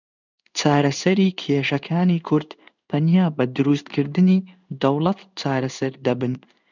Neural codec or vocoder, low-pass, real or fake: codec, 16 kHz in and 24 kHz out, 1 kbps, XY-Tokenizer; 7.2 kHz; fake